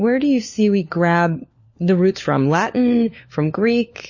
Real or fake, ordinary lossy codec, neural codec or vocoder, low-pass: fake; MP3, 32 kbps; codec, 16 kHz, 4 kbps, FunCodec, trained on LibriTTS, 50 frames a second; 7.2 kHz